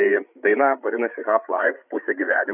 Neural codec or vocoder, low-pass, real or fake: codec, 16 kHz, 8 kbps, FreqCodec, larger model; 3.6 kHz; fake